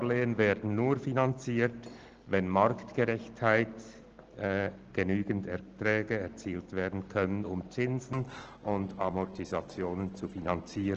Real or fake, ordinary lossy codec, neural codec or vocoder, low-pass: real; Opus, 16 kbps; none; 7.2 kHz